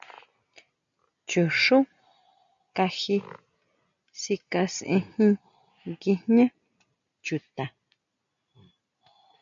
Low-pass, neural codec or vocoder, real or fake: 7.2 kHz; none; real